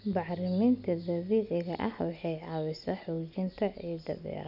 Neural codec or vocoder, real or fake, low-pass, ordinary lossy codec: none; real; 5.4 kHz; none